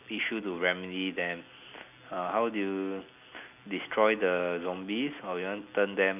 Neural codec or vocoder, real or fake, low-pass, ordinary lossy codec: none; real; 3.6 kHz; none